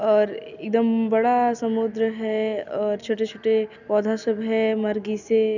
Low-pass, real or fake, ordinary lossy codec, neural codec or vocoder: 7.2 kHz; real; none; none